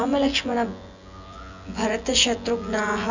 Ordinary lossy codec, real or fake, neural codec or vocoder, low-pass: none; fake; vocoder, 24 kHz, 100 mel bands, Vocos; 7.2 kHz